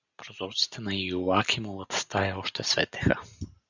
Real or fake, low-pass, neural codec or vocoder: real; 7.2 kHz; none